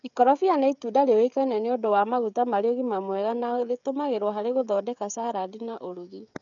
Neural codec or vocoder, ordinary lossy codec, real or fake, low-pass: codec, 16 kHz, 16 kbps, FreqCodec, smaller model; none; fake; 7.2 kHz